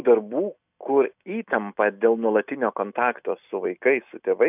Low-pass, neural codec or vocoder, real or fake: 3.6 kHz; none; real